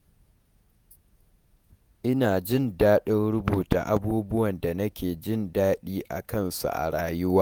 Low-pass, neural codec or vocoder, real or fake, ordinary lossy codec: none; vocoder, 48 kHz, 128 mel bands, Vocos; fake; none